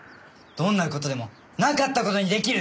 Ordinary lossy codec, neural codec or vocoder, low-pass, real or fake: none; none; none; real